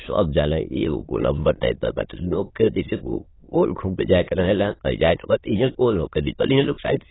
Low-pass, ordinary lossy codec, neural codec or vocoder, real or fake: 7.2 kHz; AAC, 16 kbps; autoencoder, 22.05 kHz, a latent of 192 numbers a frame, VITS, trained on many speakers; fake